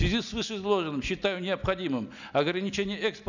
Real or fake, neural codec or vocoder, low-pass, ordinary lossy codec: real; none; 7.2 kHz; none